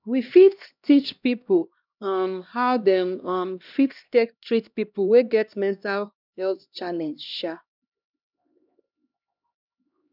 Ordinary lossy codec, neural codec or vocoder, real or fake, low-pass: none; codec, 16 kHz, 1 kbps, X-Codec, HuBERT features, trained on LibriSpeech; fake; 5.4 kHz